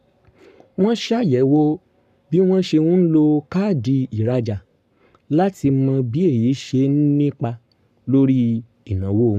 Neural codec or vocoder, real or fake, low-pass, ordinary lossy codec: codec, 44.1 kHz, 7.8 kbps, Pupu-Codec; fake; 14.4 kHz; none